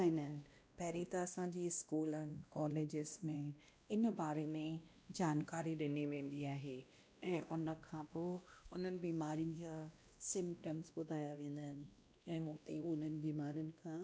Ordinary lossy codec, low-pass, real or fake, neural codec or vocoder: none; none; fake; codec, 16 kHz, 1 kbps, X-Codec, WavLM features, trained on Multilingual LibriSpeech